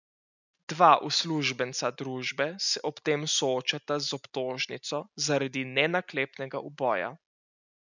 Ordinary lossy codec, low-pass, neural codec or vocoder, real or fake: none; 7.2 kHz; none; real